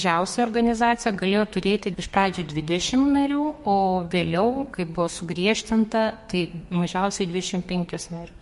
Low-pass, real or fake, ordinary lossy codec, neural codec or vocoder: 14.4 kHz; fake; MP3, 48 kbps; codec, 32 kHz, 1.9 kbps, SNAC